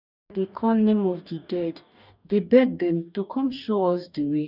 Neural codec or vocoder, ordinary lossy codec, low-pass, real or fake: codec, 44.1 kHz, 2.6 kbps, DAC; none; 5.4 kHz; fake